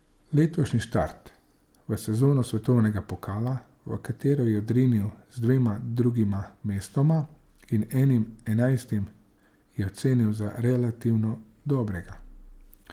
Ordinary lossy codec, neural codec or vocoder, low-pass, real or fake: Opus, 24 kbps; none; 19.8 kHz; real